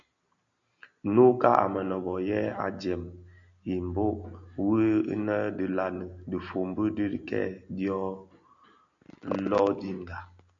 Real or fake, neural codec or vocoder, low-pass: real; none; 7.2 kHz